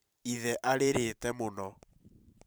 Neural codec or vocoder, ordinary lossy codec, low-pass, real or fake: vocoder, 44.1 kHz, 128 mel bands every 512 samples, BigVGAN v2; none; none; fake